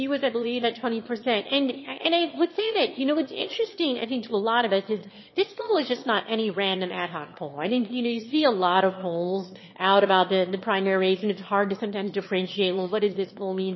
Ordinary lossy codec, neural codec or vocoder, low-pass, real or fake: MP3, 24 kbps; autoencoder, 22.05 kHz, a latent of 192 numbers a frame, VITS, trained on one speaker; 7.2 kHz; fake